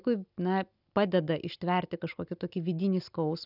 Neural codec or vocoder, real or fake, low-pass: none; real; 5.4 kHz